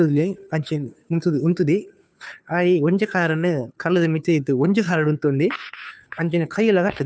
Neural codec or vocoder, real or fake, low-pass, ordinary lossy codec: codec, 16 kHz, 2 kbps, FunCodec, trained on Chinese and English, 25 frames a second; fake; none; none